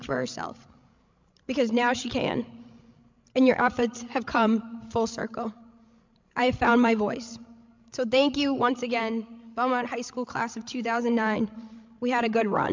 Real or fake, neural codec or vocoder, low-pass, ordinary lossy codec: fake; codec, 16 kHz, 16 kbps, FreqCodec, larger model; 7.2 kHz; MP3, 64 kbps